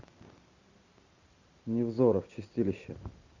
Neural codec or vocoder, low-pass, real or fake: none; 7.2 kHz; real